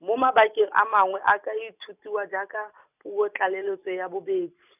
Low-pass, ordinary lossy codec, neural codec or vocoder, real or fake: 3.6 kHz; none; none; real